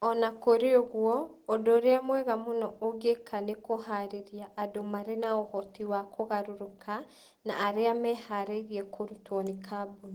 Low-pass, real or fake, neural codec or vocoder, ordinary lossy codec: 19.8 kHz; real; none; Opus, 16 kbps